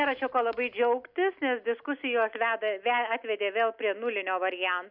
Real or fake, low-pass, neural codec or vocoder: real; 5.4 kHz; none